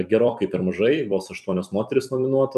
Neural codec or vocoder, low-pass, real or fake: vocoder, 44.1 kHz, 128 mel bands every 256 samples, BigVGAN v2; 14.4 kHz; fake